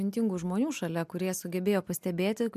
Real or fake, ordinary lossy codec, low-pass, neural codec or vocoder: real; MP3, 96 kbps; 14.4 kHz; none